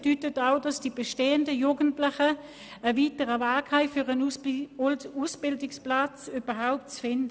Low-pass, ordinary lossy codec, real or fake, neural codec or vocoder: none; none; real; none